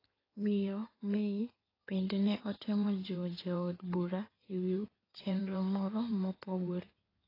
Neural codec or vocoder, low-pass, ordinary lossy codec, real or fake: codec, 16 kHz in and 24 kHz out, 2.2 kbps, FireRedTTS-2 codec; 5.4 kHz; AAC, 24 kbps; fake